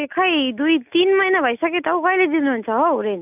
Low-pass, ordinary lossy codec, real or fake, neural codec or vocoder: 3.6 kHz; none; real; none